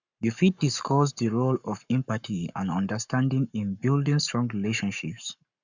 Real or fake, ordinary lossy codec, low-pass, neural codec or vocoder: fake; none; 7.2 kHz; codec, 44.1 kHz, 7.8 kbps, Pupu-Codec